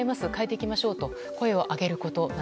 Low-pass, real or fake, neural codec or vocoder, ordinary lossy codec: none; real; none; none